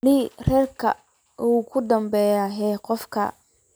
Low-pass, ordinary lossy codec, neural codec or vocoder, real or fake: none; none; none; real